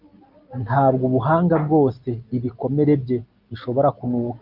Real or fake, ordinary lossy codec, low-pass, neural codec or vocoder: real; Opus, 24 kbps; 5.4 kHz; none